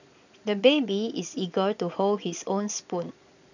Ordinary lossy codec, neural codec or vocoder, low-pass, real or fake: none; vocoder, 22.05 kHz, 80 mel bands, Vocos; 7.2 kHz; fake